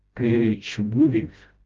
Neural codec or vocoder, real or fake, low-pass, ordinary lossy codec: codec, 16 kHz, 0.5 kbps, FreqCodec, smaller model; fake; 7.2 kHz; Opus, 32 kbps